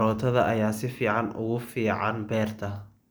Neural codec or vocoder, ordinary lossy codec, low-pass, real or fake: none; none; none; real